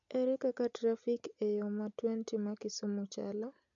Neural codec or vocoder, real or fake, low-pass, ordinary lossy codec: none; real; 7.2 kHz; none